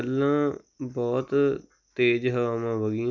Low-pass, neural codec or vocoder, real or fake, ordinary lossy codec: 7.2 kHz; none; real; none